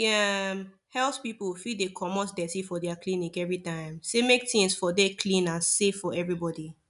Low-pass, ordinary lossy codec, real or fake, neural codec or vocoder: 10.8 kHz; none; real; none